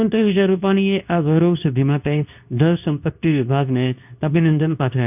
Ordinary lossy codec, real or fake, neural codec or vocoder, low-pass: none; fake; codec, 24 kHz, 0.9 kbps, WavTokenizer, medium speech release version 2; 3.6 kHz